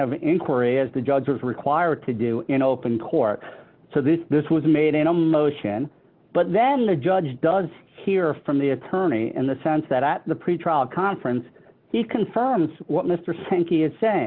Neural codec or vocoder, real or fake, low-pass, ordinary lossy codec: none; real; 5.4 kHz; Opus, 24 kbps